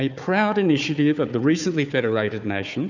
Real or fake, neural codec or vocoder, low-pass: fake; codec, 16 kHz, 4 kbps, FunCodec, trained on Chinese and English, 50 frames a second; 7.2 kHz